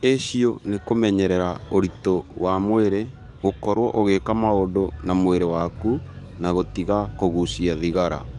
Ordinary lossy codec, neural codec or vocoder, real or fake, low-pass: none; codec, 44.1 kHz, 7.8 kbps, DAC; fake; 10.8 kHz